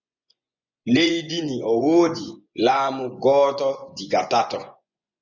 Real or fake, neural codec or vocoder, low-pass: fake; vocoder, 24 kHz, 100 mel bands, Vocos; 7.2 kHz